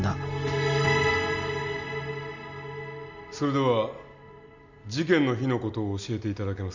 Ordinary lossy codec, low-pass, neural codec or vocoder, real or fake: none; 7.2 kHz; none; real